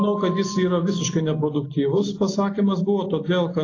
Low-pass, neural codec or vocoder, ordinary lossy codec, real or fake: 7.2 kHz; none; AAC, 32 kbps; real